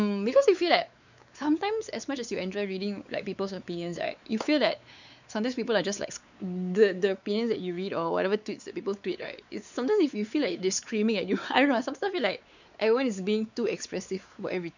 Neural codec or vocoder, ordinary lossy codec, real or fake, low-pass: codec, 16 kHz, 4 kbps, X-Codec, WavLM features, trained on Multilingual LibriSpeech; none; fake; 7.2 kHz